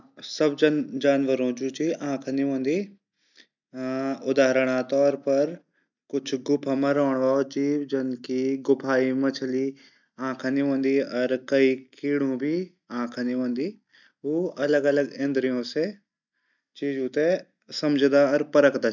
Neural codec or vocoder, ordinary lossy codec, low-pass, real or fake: none; none; 7.2 kHz; real